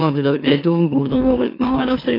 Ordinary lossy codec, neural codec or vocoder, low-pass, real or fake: AAC, 32 kbps; autoencoder, 44.1 kHz, a latent of 192 numbers a frame, MeloTTS; 5.4 kHz; fake